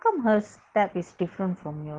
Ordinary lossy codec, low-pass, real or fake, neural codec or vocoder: Opus, 16 kbps; 9.9 kHz; real; none